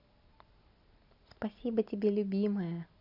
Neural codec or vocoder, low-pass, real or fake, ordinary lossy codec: none; 5.4 kHz; real; none